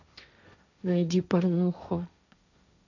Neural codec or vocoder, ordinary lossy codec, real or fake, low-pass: codec, 16 kHz, 1.1 kbps, Voila-Tokenizer; none; fake; 7.2 kHz